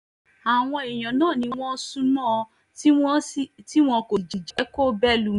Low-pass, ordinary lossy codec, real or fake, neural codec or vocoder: 10.8 kHz; none; real; none